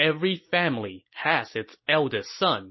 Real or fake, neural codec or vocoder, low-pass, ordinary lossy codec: real; none; 7.2 kHz; MP3, 24 kbps